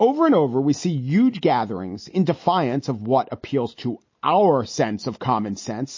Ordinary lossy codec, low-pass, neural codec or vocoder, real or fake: MP3, 32 kbps; 7.2 kHz; none; real